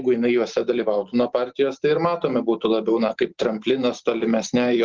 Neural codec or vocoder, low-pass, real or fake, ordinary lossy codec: none; 7.2 kHz; real; Opus, 16 kbps